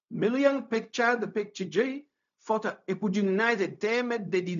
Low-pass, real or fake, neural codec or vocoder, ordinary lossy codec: 7.2 kHz; fake; codec, 16 kHz, 0.4 kbps, LongCat-Audio-Codec; none